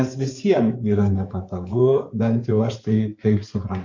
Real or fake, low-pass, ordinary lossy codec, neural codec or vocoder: fake; 7.2 kHz; MP3, 48 kbps; codec, 16 kHz in and 24 kHz out, 2.2 kbps, FireRedTTS-2 codec